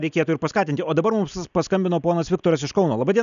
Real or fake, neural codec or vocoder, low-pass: real; none; 7.2 kHz